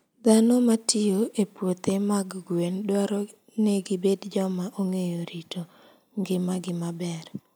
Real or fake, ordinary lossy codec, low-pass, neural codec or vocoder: real; none; none; none